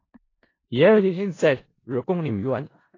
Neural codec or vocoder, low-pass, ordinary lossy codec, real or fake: codec, 16 kHz in and 24 kHz out, 0.4 kbps, LongCat-Audio-Codec, four codebook decoder; 7.2 kHz; AAC, 32 kbps; fake